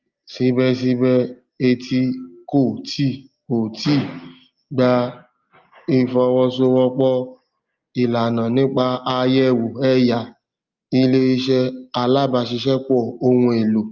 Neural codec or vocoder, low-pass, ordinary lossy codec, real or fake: none; 7.2 kHz; Opus, 24 kbps; real